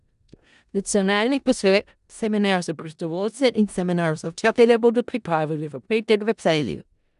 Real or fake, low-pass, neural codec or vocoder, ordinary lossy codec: fake; 10.8 kHz; codec, 16 kHz in and 24 kHz out, 0.4 kbps, LongCat-Audio-Codec, four codebook decoder; none